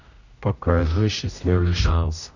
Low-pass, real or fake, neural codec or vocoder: 7.2 kHz; fake; codec, 16 kHz, 0.5 kbps, X-Codec, HuBERT features, trained on general audio